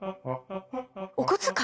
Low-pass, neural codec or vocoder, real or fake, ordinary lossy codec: none; none; real; none